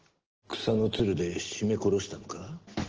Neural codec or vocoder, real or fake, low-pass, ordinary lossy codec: none; real; 7.2 kHz; Opus, 16 kbps